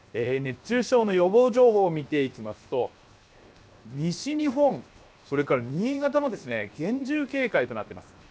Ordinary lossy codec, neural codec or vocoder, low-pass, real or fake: none; codec, 16 kHz, 0.7 kbps, FocalCodec; none; fake